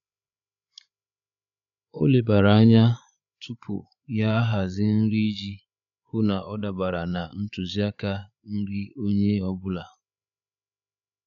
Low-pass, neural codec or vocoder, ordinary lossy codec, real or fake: 7.2 kHz; codec, 16 kHz, 8 kbps, FreqCodec, larger model; none; fake